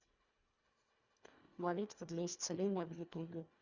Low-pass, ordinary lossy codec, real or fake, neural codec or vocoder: 7.2 kHz; Opus, 64 kbps; fake; codec, 24 kHz, 1.5 kbps, HILCodec